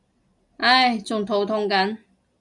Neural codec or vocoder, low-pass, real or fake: none; 10.8 kHz; real